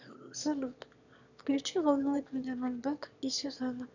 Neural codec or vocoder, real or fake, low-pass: autoencoder, 22.05 kHz, a latent of 192 numbers a frame, VITS, trained on one speaker; fake; 7.2 kHz